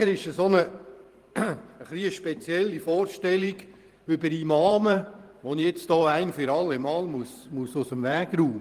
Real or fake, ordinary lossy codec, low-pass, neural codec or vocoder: fake; Opus, 24 kbps; 14.4 kHz; vocoder, 44.1 kHz, 128 mel bands every 512 samples, BigVGAN v2